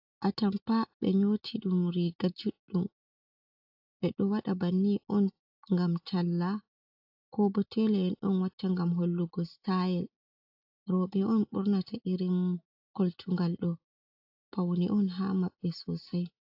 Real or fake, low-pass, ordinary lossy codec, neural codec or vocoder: real; 5.4 kHz; AAC, 48 kbps; none